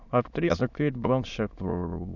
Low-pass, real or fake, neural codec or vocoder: 7.2 kHz; fake; autoencoder, 22.05 kHz, a latent of 192 numbers a frame, VITS, trained on many speakers